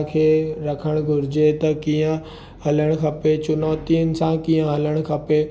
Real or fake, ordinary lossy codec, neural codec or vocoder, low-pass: real; none; none; none